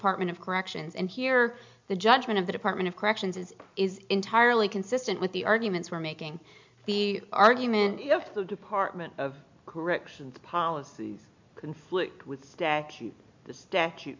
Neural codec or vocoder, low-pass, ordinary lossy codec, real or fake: none; 7.2 kHz; MP3, 64 kbps; real